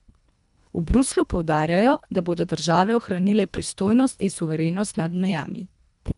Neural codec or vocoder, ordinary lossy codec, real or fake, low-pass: codec, 24 kHz, 1.5 kbps, HILCodec; none; fake; 10.8 kHz